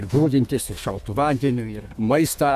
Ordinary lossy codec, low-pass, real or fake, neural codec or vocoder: AAC, 96 kbps; 14.4 kHz; fake; codec, 32 kHz, 1.9 kbps, SNAC